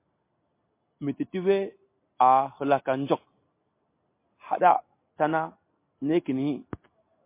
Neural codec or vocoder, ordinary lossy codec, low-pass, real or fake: none; MP3, 24 kbps; 3.6 kHz; real